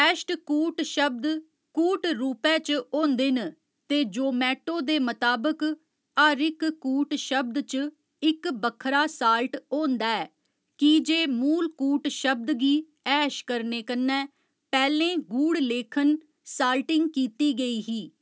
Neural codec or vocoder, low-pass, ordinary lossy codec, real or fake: none; none; none; real